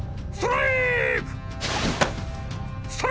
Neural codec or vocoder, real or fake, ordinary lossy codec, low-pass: none; real; none; none